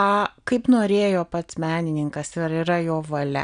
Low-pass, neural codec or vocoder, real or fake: 9.9 kHz; none; real